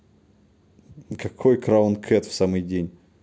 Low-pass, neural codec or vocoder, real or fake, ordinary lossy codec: none; none; real; none